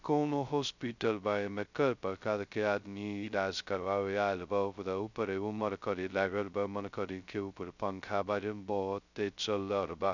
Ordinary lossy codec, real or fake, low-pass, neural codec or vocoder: none; fake; 7.2 kHz; codec, 16 kHz, 0.2 kbps, FocalCodec